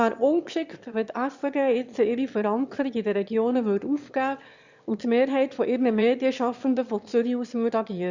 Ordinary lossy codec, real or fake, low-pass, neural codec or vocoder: Opus, 64 kbps; fake; 7.2 kHz; autoencoder, 22.05 kHz, a latent of 192 numbers a frame, VITS, trained on one speaker